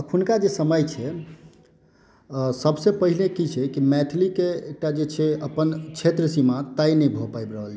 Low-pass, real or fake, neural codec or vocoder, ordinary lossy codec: none; real; none; none